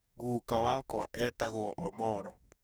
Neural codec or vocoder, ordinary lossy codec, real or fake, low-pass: codec, 44.1 kHz, 2.6 kbps, DAC; none; fake; none